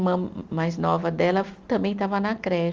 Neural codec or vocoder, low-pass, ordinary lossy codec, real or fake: none; 7.2 kHz; Opus, 32 kbps; real